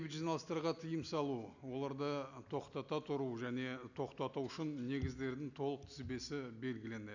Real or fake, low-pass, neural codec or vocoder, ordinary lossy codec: real; 7.2 kHz; none; none